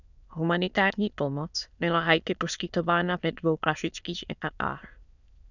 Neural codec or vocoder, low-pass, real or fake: autoencoder, 22.05 kHz, a latent of 192 numbers a frame, VITS, trained on many speakers; 7.2 kHz; fake